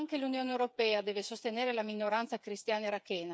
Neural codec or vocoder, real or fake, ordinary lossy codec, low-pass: codec, 16 kHz, 8 kbps, FreqCodec, smaller model; fake; none; none